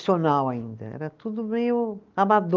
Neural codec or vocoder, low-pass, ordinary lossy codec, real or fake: none; 7.2 kHz; Opus, 32 kbps; real